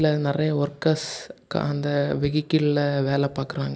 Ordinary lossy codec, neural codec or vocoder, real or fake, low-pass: none; none; real; none